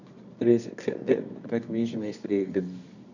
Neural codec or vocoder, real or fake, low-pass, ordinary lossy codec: codec, 24 kHz, 0.9 kbps, WavTokenizer, medium music audio release; fake; 7.2 kHz; none